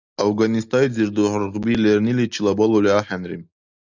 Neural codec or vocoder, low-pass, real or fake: none; 7.2 kHz; real